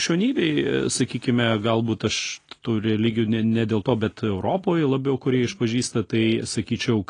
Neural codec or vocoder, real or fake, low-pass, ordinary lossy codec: none; real; 9.9 kHz; AAC, 32 kbps